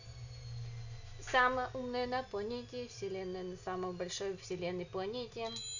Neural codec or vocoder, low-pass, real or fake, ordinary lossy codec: none; 7.2 kHz; real; none